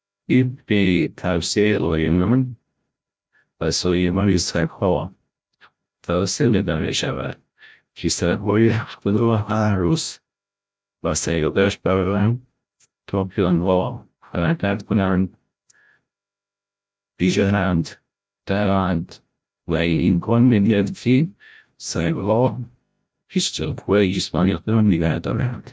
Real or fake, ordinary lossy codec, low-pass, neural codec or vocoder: fake; none; none; codec, 16 kHz, 0.5 kbps, FreqCodec, larger model